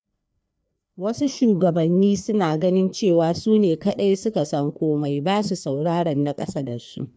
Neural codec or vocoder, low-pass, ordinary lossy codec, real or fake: codec, 16 kHz, 2 kbps, FreqCodec, larger model; none; none; fake